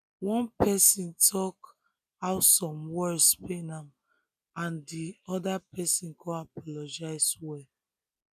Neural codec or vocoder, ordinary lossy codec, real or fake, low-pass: none; none; real; 14.4 kHz